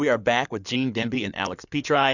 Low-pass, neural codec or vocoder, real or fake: 7.2 kHz; codec, 16 kHz in and 24 kHz out, 2.2 kbps, FireRedTTS-2 codec; fake